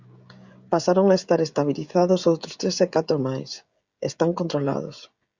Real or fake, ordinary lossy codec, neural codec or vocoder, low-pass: fake; Opus, 64 kbps; codec, 16 kHz, 16 kbps, FreqCodec, smaller model; 7.2 kHz